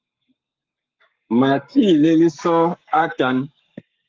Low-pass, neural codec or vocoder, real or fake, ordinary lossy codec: 7.2 kHz; codec, 44.1 kHz, 7.8 kbps, Pupu-Codec; fake; Opus, 32 kbps